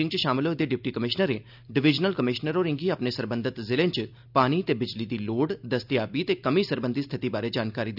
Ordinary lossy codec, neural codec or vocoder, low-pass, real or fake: none; none; 5.4 kHz; real